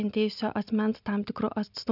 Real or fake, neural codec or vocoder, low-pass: real; none; 5.4 kHz